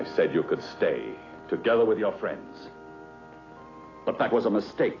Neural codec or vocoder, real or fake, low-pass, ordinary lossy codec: none; real; 7.2 kHz; AAC, 32 kbps